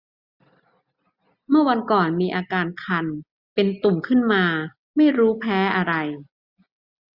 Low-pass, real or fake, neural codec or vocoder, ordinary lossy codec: 5.4 kHz; real; none; Opus, 64 kbps